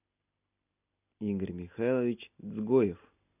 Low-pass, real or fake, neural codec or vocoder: 3.6 kHz; real; none